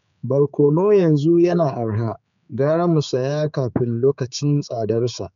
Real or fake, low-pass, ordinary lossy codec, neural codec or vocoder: fake; 7.2 kHz; none; codec, 16 kHz, 4 kbps, X-Codec, HuBERT features, trained on general audio